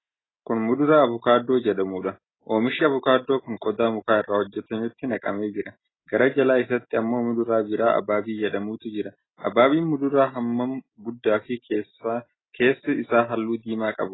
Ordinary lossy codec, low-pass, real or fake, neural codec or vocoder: AAC, 16 kbps; 7.2 kHz; real; none